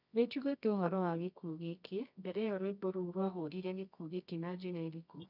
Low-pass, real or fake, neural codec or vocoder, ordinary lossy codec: 5.4 kHz; fake; codec, 24 kHz, 0.9 kbps, WavTokenizer, medium music audio release; none